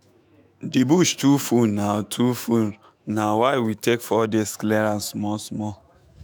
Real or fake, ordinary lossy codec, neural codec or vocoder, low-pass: fake; none; autoencoder, 48 kHz, 128 numbers a frame, DAC-VAE, trained on Japanese speech; none